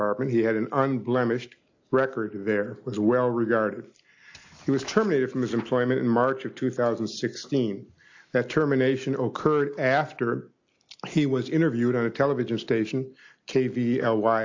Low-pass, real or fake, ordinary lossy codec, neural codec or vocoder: 7.2 kHz; real; AAC, 48 kbps; none